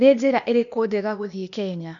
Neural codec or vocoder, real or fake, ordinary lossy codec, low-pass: codec, 16 kHz, 0.8 kbps, ZipCodec; fake; none; 7.2 kHz